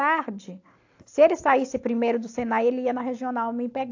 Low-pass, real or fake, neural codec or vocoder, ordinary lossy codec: 7.2 kHz; real; none; AAC, 48 kbps